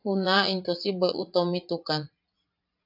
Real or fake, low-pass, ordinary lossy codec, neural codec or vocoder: fake; 5.4 kHz; AAC, 48 kbps; vocoder, 44.1 kHz, 80 mel bands, Vocos